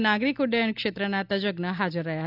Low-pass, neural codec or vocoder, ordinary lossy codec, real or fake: 5.4 kHz; none; none; real